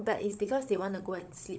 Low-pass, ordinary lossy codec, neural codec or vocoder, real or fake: none; none; codec, 16 kHz, 16 kbps, FunCodec, trained on LibriTTS, 50 frames a second; fake